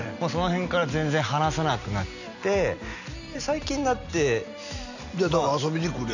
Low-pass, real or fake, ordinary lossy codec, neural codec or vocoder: 7.2 kHz; real; none; none